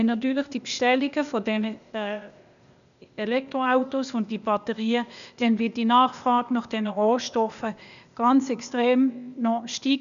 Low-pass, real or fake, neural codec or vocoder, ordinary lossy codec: 7.2 kHz; fake; codec, 16 kHz, 0.8 kbps, ZipCodec; none